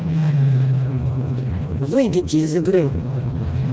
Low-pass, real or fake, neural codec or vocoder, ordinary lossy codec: none; fake; codec, 16 kHz, 1 kbps, FreqCodec, smaller model; none